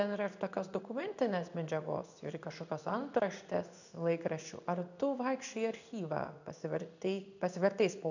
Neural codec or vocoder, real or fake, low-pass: codec, 16 kHz in and 24 kHz out, 1 kbps, XY-Tokenizer; fake; 7.2 kHz